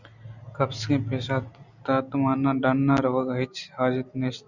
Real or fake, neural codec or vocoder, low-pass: real; none; 7.2 kHz